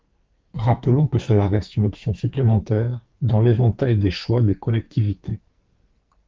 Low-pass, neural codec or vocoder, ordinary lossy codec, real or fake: 7.2 kHz; codec, 44.1 kHz, 2.6 kbps, SNAC; Opus, 24 kbps; fake